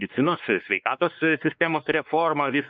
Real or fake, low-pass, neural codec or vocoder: fake; 7.2 kHz; codec, 16 kHz, 2 kbps, X-Codec, WavLM features, trained on Multilingual LibriSpeech